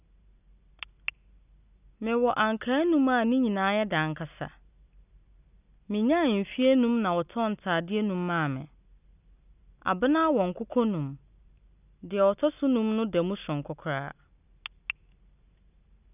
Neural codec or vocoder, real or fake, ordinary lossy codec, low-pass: none; real; none; 3.6 kHz